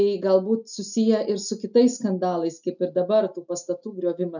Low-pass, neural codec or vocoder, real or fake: 7.2 kHz; none; real